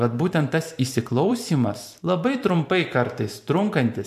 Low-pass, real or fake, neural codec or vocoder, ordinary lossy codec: 14.4 kHz; fake; vocoder, 44.1 kHz, 128 mel bands every 512 samples, BigVGAN v2; MP3, 96 kbps